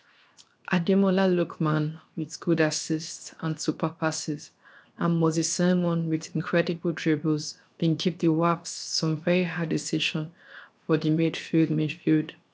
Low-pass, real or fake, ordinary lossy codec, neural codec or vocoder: none; fake; none; codec, 16 kHz, 0.7 kbps, FocalCodec